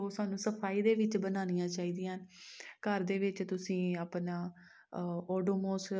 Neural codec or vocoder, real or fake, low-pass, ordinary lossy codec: none; real; none; none